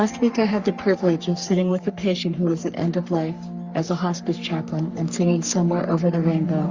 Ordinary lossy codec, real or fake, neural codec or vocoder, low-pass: Opus, 64 kbps; fake; codec, 44.1 kHz, 3.4 kbps, Pupu-Codec; 7.2 kHz